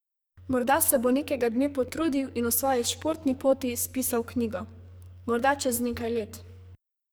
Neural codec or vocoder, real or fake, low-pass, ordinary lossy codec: codec, 44.1 kHz, 2.6 kbps, SNAC; fake; none; none